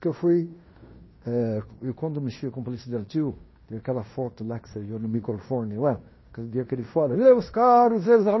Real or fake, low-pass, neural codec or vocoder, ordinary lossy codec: fake; 7.2 kHz; codec, 16 kHz in and 24 kHz out, 0.9 kbps, LongCat-Audio-Codec, fine tuned four codebook decoder; MP3, 24 kbps